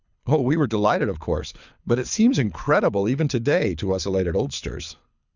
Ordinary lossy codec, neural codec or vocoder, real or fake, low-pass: Opus, 64 kbps; codec, 24 kHz, 6 kbps, HILCodec; fake; 7.2 kHz